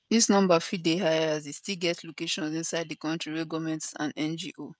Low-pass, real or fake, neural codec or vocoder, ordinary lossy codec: none; fake; codec, 16 kHz, 16 kbps, FreqCodec, smaller model; none